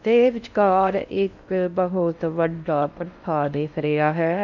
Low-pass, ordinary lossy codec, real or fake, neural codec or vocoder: 7.2 kHz; none; fake; codec, 16 kHz in and 24 kHz out, 0.6 kbps, FocalCodec, streaming, 4096 codes